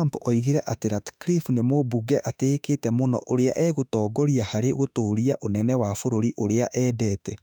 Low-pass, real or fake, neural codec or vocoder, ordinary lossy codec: 19.8 kHz; fake; autoencoder, 48 kHz, 32 numbers a frame, DAC-VAE, trained on Japanese speech; none